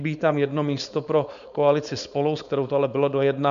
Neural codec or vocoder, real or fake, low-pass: codec, 16 kHz, 4.8 kbps, FACodec; fake; 7.2 kHz